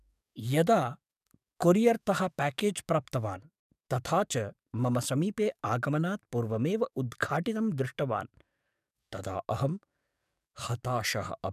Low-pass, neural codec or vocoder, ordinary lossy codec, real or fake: 14.4 kHz; codec, 44.1 kHz, 7.8 kbps, DAC; none; fake